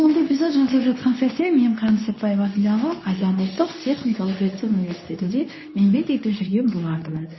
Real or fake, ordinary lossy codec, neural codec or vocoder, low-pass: fake; MP3, 24 kbps; codec, 24 kHz, 0.9 kbps, WavTokenizer, medium speech release version 2; 7.2 kHz